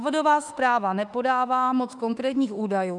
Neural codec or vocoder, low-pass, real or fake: autoencoder, 48 kHz, 32 numbers a frame, DAC-VAE, trained on Japanese speech; 10.8 kHz; fake